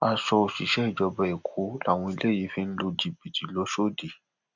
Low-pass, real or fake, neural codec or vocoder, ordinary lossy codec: 7.2 kHz; real; none; none